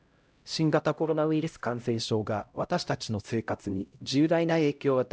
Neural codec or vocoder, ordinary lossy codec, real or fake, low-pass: codec, 16 kHz, 0.5 kbps, X-Codec, HuBERT features, trained on LibriSpeech; none; fake; none